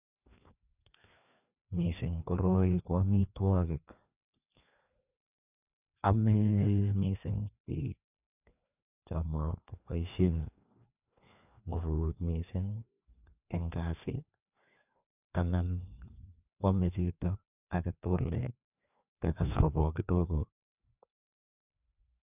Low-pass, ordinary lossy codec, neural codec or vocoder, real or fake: 3.6 kHz; none; codec, 16 kHz, 2 kbps, FreqCodec, larger model; fake